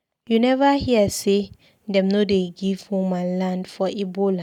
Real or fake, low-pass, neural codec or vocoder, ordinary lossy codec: real; 19.8 kHz; none; none